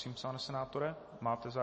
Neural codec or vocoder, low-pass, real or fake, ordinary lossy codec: none; 9.9 kHz; real; MP3, 32 kbps